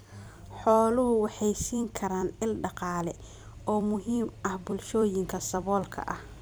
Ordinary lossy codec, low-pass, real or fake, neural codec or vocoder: none; none; fake; vocoder, 44.1 kHz, 128 mel bands every 256 samples, BigVGAN v2